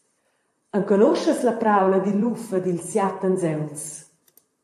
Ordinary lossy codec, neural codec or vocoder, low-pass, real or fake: AAC, 64 kbps; vocoder, 44.1 kHz, 128 mel bands, Pupu-Vocoder; 14.4 kHz; fake